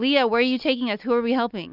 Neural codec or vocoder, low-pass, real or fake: none; 5.4 kHz; real